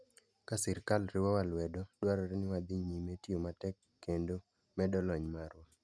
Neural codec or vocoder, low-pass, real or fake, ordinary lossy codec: none; none; real; none